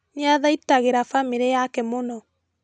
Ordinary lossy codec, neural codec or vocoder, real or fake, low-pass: none; none; real; 9.9 kHz